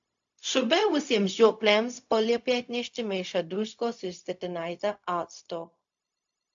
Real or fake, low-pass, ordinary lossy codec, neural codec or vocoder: fake; 7.2 kHz; AAC, 64 kbps; codec, 16 kHz, 0.4 kbps, LongCat-Audio-Codec